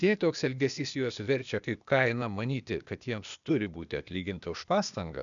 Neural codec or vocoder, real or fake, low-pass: codec, 16 kHz, 0.8 kbps, ZipCodec; fake; 7.2 kHz